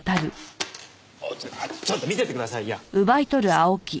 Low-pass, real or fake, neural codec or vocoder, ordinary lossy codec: none; real; none; none